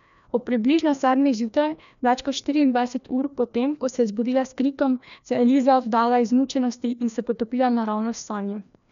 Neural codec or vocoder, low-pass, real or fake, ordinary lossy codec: codec, 16 kHz, 1 kbps, FreqCodec, larger model; 7.2 kHz; fake; none